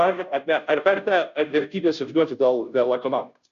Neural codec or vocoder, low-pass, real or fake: codec, 16 kHz, 0.5 kbps, FunCodec, trained on Chinese and English, 25 frames a second; 7.2 kHz; fake